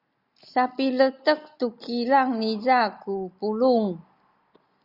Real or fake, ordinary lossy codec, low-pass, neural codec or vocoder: real; Opus, 64 kbps; 5.4 kHz; none